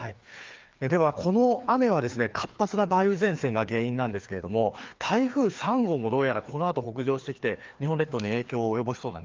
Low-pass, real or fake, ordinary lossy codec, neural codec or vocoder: 7.2 kHz; fake; Opus, 32 kbps; codec, 16 kHz, 2 kbps, FreqCodec, larger model